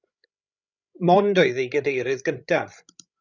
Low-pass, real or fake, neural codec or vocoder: 7.2 kHz; fake; codec, 16 kHz, 16 kbps, FreqCodec, larger model